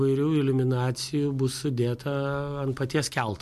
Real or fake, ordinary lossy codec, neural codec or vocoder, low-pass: real; MP3, 64 kbps; none; 14.4 kHz